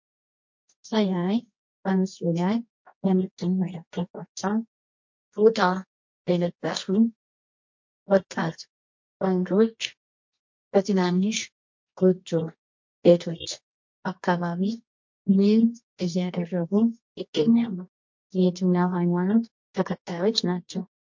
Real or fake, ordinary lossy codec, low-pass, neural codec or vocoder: fake; MP3, 48 kbps; 7.2 kHz; codec, 24 kHz, 0.9 kbps, WavTokenizer, medium music audio release